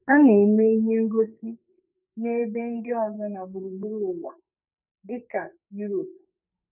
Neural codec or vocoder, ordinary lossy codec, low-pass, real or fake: codec, 44.1 kHz, 2.6 kbps, SNAC; none; 3.6 kHz; fake